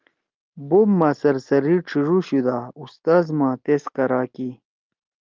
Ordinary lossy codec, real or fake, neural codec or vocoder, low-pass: Opus, 32 kbps; real; none; 7.2 kHz